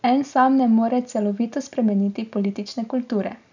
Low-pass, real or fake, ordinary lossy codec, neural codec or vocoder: 7.2 kHz; real; none; none